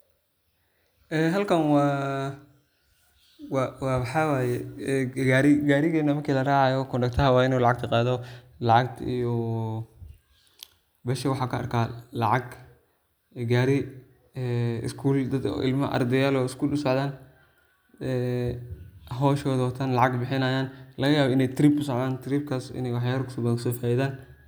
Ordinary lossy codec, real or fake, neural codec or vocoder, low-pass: none; real; none; none